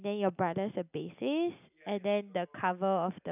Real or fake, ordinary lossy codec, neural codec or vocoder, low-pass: real; none; none; 3.6 kHz